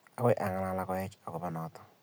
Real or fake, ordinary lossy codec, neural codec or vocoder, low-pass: real; none; none; none